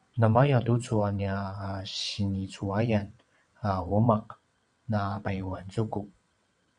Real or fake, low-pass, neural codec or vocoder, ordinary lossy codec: fake; 9.9 kHz; vocoder, 22.05 kHz, 80 mel bands, WaveNeXt; MP3, 96 kbps